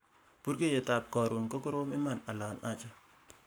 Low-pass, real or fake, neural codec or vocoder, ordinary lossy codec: none; fake; codec, 44.1 kHz, 7.8 kbps, Pupu-Codec; none